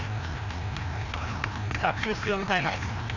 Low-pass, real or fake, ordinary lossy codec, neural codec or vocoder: 7.2 kHz; fake; none; codec, 16 kHz, 1 kbps, FreqCodec, larger model